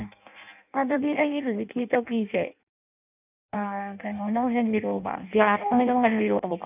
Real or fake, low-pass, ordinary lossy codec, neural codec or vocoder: fake; 3.6 kHz; none; codec, 16 kHz in and 24 kHz out, 0.6 kbps, FireRedTTS-2 codec